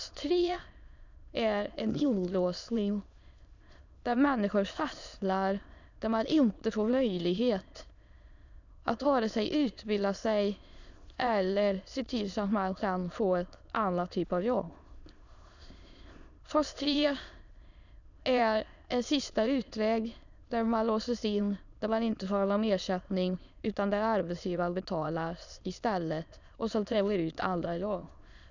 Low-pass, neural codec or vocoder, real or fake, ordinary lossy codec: 7.2 kHz; autoencoder, 22.05 kHz, a latent of 192 numbers a frame, VITS, trained on many speakers; fake; none